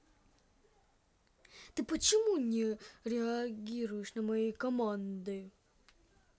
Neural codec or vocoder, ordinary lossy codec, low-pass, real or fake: none; none; none; real